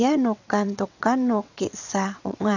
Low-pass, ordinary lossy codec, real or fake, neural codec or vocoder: 7.2 kHz; none; fake; vocoder, 22.05 kHz, 80 mel bands, WaveNeXt